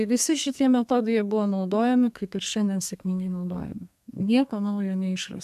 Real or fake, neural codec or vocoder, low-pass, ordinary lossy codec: fake; codec, 32 kHz, 1.9 kbps, SNAC; 14.4 kHz; AAC, 96 kbps